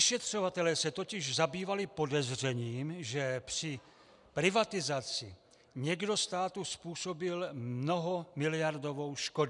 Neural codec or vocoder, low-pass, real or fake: none; 10.8 kHz; real